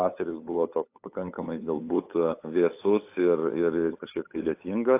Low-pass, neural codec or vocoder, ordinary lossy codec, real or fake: 3.6 kHz; codec, 16 kHz, 8 kbps, FunCodec, trained on LibriTTS, 25 frames a second; AAC, 24 kbps; fake